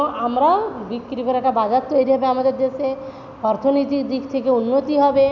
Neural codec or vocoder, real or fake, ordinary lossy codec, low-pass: none; real; none; 7.2 kHz